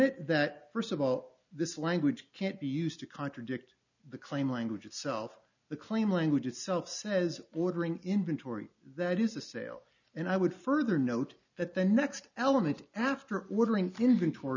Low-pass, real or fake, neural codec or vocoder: 7.2 kHz; real; none